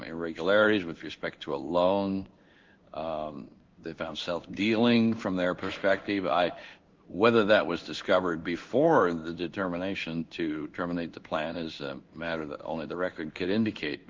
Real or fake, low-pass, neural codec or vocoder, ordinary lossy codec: fake; 7.2 kHz; codec, 16 kHz in and 24 kHz out, 1 kbps, XY-Tokenizer; Opus, 32 kbps